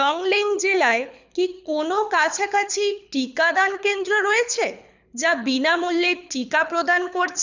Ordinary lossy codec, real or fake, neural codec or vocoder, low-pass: none; fake; codec, 24 kHz, 6 kbps, HILCodec; 7.2 kHz